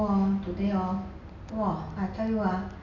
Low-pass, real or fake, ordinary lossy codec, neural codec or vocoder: 7.2 kHz; real; none; none